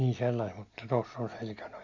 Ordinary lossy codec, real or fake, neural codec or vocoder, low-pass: MP3, 64 kbps; real; none; 7.2 kHz